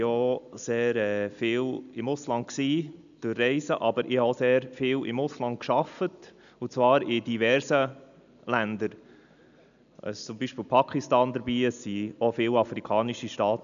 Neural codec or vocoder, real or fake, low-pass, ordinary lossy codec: none; real; 7.2 kHz; none